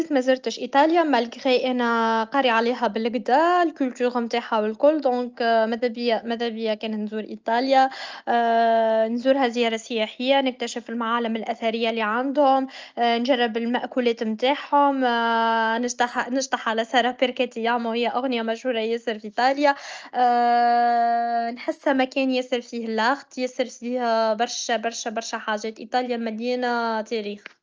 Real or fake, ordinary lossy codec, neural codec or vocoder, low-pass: real; Opus, 24 kbps; none; 7.2 kHz